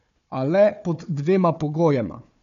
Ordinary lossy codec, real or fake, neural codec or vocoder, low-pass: none; fake; codec, 16 kHz, 4 kbps, FunCodec, trained on Chinese and English, 50 frames a second; 7.2 kHz